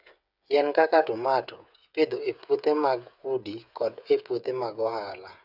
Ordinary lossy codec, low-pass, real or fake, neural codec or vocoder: none; 5.4 kHz; fake; codec, 16 kHz, 8 kbps, FreqCodec, smaller model